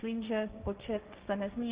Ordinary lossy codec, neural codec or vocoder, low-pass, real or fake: Opus, 32 kbps; codec, 16 kHz, 1.1 kbps, Voila-Tokenizer; 3.6 kHz; fake